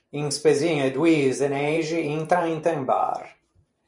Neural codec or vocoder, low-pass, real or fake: vocoder, 44.1 kHz, 128 mel bands every 512 samples, BigVGAN v2; 10.8 kHz; fake